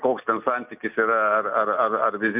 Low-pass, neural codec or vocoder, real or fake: 3.6 kHz; none; real